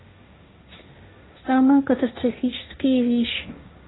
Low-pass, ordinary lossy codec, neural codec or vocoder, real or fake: 7.2 kHz; AAC, 16 kbps; codec, 16 kHz, 1.1 kbps, Voila-Tokenizer; fake